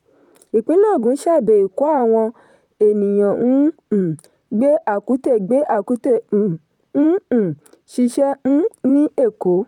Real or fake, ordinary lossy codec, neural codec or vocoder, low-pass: fake; none; vocoder, 44.1 kHz, 128 mel bands, Pupu-Vocoder; 19.8 kHz